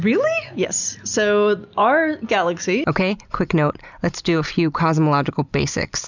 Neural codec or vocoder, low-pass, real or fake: none; 7.2 kHz; real